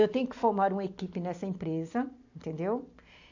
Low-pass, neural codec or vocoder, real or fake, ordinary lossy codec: 7.2 kHz; none; real; AAC, 48 kbps